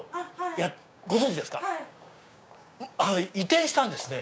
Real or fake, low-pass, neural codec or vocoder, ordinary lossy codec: fake; none; codec, 16 kHz, 6 kbps, DAC; none